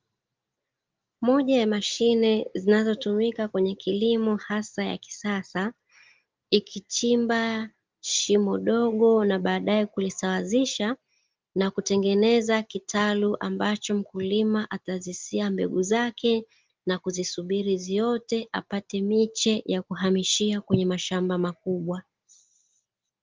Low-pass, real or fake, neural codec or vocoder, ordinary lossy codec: 7.2 kHz; real; none; Opus, 32 kbps